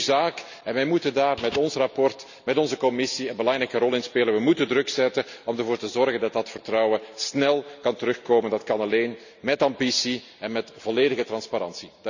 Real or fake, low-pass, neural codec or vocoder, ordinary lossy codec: real; 7.2 kHz; none; none